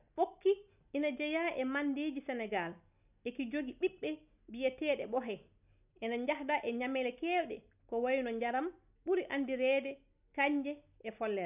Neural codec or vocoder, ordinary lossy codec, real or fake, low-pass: none; none; real; 3.6 kHz